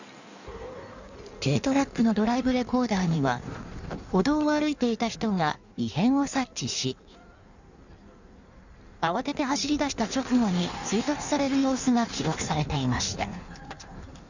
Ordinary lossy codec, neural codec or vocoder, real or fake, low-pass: none; codec, 16 kHz in and 24 kHz out, 1.1 kbps, FireRedTTS-2 codec; fake; 7.2 kHz